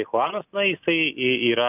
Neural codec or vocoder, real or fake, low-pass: none; real; 3.6 kHz